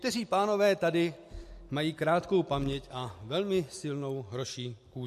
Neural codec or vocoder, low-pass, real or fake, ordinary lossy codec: none; 14.4 kHz; real; MP3, 64 kbps